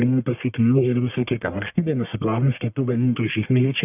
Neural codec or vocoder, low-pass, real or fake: codec, 44.1 kHz, 1.7 kbps, Pupu-Codec; 3.6 kHz; fake